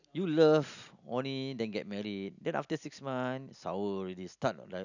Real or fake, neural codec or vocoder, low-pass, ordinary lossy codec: real; none; 7.2 kHz; none